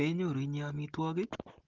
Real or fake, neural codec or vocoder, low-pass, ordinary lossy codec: real; none; 7.2 kHz; Opus, 16 kbps